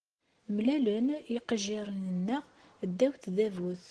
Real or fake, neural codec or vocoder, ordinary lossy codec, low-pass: real; none; Opus, 16 kbps; 10.8 kHz